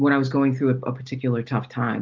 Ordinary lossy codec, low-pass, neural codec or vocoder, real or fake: Opus, 32 kbps; 7.2 kHz; none; real